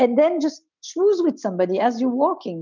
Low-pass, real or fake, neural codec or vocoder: 7.2 kHz; real; none